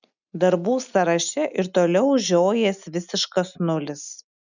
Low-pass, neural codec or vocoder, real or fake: 7.2 kHz; none; real